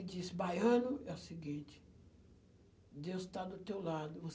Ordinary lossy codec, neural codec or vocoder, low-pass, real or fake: none; none; none; real